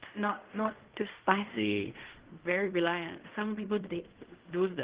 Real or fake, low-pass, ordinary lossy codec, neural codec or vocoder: fake; 3.6 kHz; Opus, 16 kbps; codec, 16 kHz in and 24 kHz out, 0.4 kbps, LongCat-Audio-Codec, fine tuned four codebook decoder